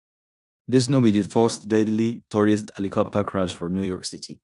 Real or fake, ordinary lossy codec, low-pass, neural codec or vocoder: fake; none; 10.8 kHz; codec, 16 kHz in and 24 kHz out, 0.9 kbps, LongCat-Audio-Codec, four codebook decoder